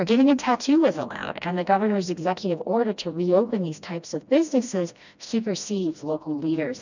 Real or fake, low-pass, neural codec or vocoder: fake; 7.2 kHz; codec, 16 kHz, 1 kbps, FreqCodec, smaller model